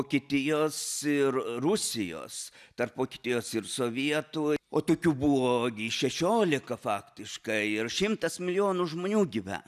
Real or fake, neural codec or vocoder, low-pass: real; none; 14.4 kHz